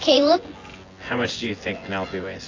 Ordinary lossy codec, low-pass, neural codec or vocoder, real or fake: AAC, 32 kbps; 7.2 kHz; vocoder, 24 kHz, 100 mel bands, Vocos; fake